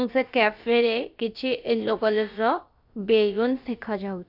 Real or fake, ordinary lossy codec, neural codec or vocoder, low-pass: fake; none; codec, 16 kHz, about 1 kbps, DyCAST, with the encoder's durations; 5.4 kHz